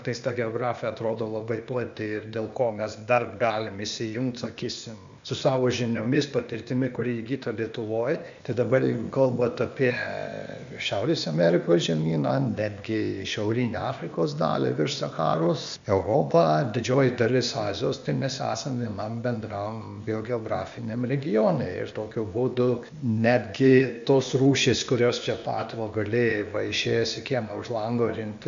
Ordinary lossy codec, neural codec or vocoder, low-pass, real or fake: MP3, 64 kbps; codec, 16 kHz, 0.8 kbps, ZipCodec; 7.2 kHz; fake